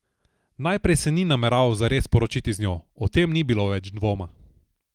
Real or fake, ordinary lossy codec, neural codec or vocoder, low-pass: real; Opus, 24 kbps; none; 19.8 kHz